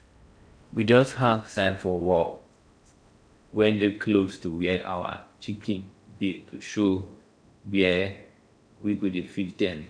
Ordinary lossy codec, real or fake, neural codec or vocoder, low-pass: none; fake; codec, 16 kHz in and 24 kHz out, 0.6 kbps, FocalCodec, streaming, 4096 codes; 9.9 kHz